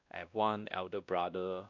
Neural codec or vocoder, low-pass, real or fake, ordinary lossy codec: codec, 16 kHz, 1 kbps, X-Codec, WavLM features, trained on Multilingual LibriSpeech; 7.2 kHz; fake; MP3, 64 kbps